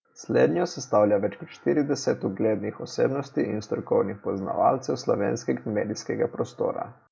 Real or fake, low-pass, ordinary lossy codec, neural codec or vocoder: real; none; none; none